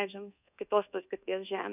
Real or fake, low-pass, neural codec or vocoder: fake; 3.6 kHz; codec, 24 kHz, 1.2 kbps, DualCodec